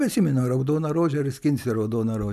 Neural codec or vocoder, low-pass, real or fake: none; 14.4 kHz; real